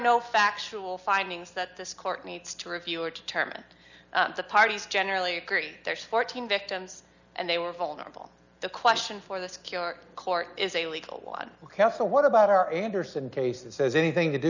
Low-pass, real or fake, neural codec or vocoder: 7.2 kHz; real; none